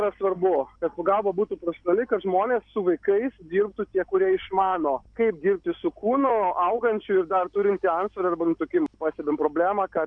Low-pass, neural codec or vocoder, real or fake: 9.9 kHz; none; real